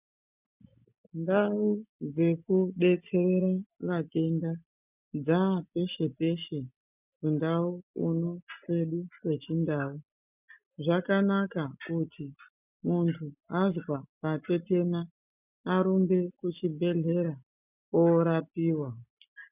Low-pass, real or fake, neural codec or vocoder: 3.6 kHz; real; none